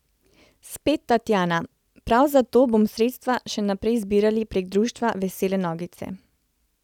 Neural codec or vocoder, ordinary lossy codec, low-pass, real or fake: none; none; 19.8 kHz; real